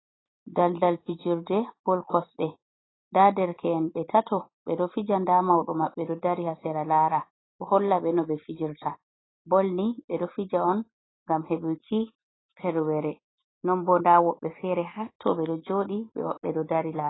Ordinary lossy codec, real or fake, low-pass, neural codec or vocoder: AAC, 16 kbps; real; 7.2 kHz; none